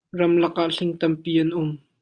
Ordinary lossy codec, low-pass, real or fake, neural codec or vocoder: Opus, 64 kbps; 9.9 kHz; real; none